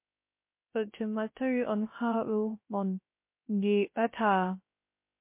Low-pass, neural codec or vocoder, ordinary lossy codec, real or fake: 3.6 kHz; codec, 16 kHz, 0.3 kbps, FocalCodec; MP3, 24 kbps; fake